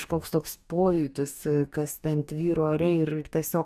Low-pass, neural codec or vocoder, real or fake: 14.4 kHz; codec, 44.1 kHz, 2.6 kbps, DAC; fake